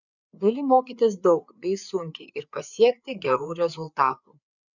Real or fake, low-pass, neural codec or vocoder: fake; 7.2 kHz; codec, 16 kHz, 4 kbps, FreqCodec, larger model